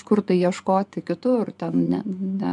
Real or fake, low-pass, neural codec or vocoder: fake; 10.8 kHz; vocoder, 24 kHz, 100 mel bands, Vocos